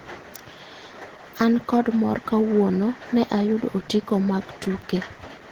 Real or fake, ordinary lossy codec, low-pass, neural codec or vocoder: real; Opus, 16 kbps; 19.8 kHz; none